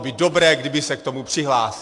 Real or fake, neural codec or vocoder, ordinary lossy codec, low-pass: real; none; AAC, 64 kbps; 10.8 kHz